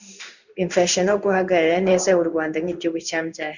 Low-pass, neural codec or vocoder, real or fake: 7.2 kHz; codec, 16 kHz in and 24 kHz out, 1 kbps, XY-Tokenizer; fake